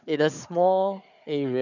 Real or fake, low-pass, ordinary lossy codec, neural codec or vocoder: fake; 7.2 kHz; none; codec, 16 kHz, 4 kbps, FunCodec, trained on Chinese and English, 50 frames a second